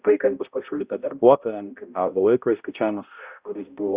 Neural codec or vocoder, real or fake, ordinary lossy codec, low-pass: codec, 16 kHz, 0.5 kbps, X-Codec, HuBERT features, trained on balanced general audio; fake; Opus, 64 kbps; 3.6 kHz